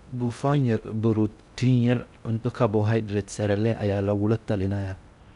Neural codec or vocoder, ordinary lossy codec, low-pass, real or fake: codec, 16 kHz in and 24 kHz out, 0.6 kbps, FocalCodec, streaming, 2048 codes; none; 10.8 kHz; fake